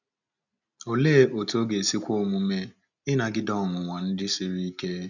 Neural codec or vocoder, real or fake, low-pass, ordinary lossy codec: none; real; 7.2 kHz; none